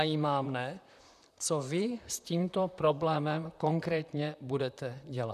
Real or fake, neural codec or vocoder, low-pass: fake; vocoder, 44.1 kHz, 128 mel bands, Pupu-Vocoder; 14.4 kHz